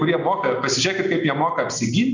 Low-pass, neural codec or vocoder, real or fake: 7.2 kHz; none; real